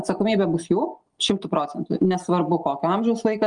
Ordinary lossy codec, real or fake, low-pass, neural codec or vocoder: Opus, 32 kbps; real; 9.9 kHz; none